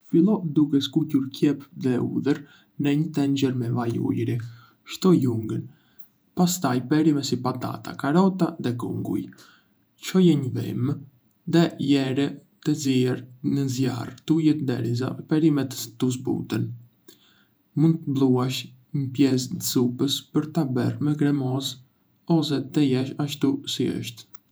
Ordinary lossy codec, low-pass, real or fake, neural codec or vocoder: none; none; fake; vocoder, 48 kHz, 128 mel bands, Vocos